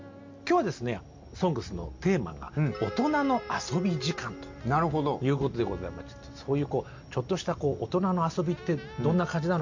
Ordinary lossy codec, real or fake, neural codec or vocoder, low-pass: MP3, 48 kbps; real; none; 7.2 kHz